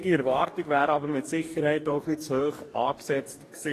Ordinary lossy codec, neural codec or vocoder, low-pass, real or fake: AAC, 48 kbps; codec, 44.1 kHz, 2.6 kbps, DAC; 14.4 kHz; fake